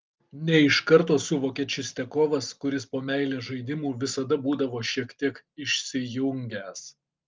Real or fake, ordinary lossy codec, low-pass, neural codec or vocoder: real; Opus, 32 kbps; 7.2 kHz; none